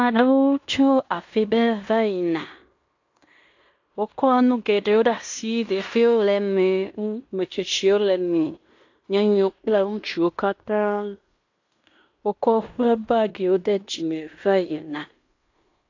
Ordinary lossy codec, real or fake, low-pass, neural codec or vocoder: AAC, 48 kbps; fake; 7.2 kHz; codec, 16 kHz in and 24 kHz out, 0.9 kbps, LongCat-Audio-Codec, fine tuned four codebook decoder